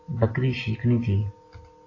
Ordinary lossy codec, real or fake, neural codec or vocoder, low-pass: AAC, 32 kbps; real; none; 7.2 kHz